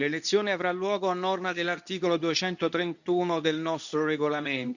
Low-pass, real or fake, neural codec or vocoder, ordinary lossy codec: 7.2 kHz; fake; codec, 24 kHz, 0.9 kbps, WavTokenizer, medium speech release version 2; none